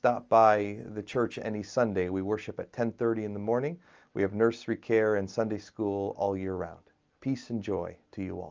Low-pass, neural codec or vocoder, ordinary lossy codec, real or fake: 7.2 kHz; none; Opus, 24 kbps; real